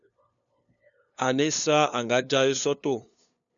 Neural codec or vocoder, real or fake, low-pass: codec, 16 kHz, 2 kbps, FunCodec, trained on LibriTTS, 25 frames a second; fake; 7.2 kHz